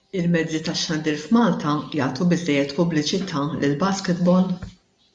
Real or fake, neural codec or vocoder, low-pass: real; none; 10.8 kHz